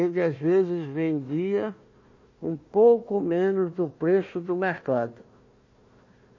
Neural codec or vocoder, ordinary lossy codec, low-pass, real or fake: codec, 16 kHz, 1 kbps, FunCodec, trained on Chinese and English, 50 frames a second; MP3, 32 kbps; 7.2 kHz; fake